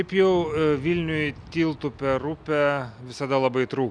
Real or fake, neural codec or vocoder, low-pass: real; none; 9.9 kHz